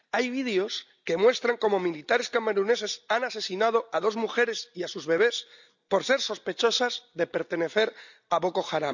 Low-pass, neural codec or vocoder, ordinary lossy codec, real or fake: 7.2 kHz; none; none; real